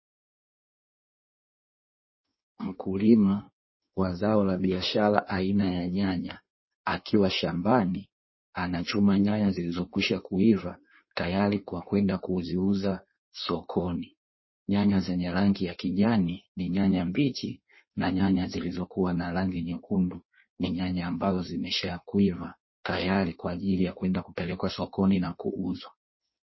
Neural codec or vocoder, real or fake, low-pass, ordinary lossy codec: codec, 16 kHz in and 24 kHz out, 1.1 kbps, FireRedTTS-2 codec; fake; 7.2 kHz; MP3, 24 kbps